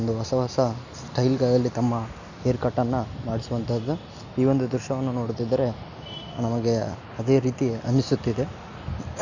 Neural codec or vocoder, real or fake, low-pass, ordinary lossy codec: none; real; 7.2 kHz; none